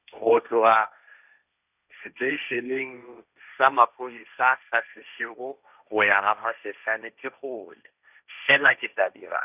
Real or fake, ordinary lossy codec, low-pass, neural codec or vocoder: fake; none; 3.6 kHz; codec, 16 kHz, 1.1 kbps, Voila-Tokenizer